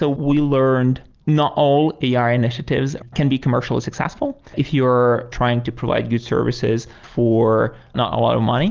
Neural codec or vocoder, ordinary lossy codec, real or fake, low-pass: none; Opus, 24 kbps; real; 7.2 kHz